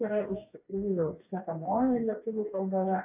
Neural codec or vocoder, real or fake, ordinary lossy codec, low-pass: codec, 44.1 kHz, 2.6 kbps, DAC; fake; AAC, 32 kbps; 3.6 kHz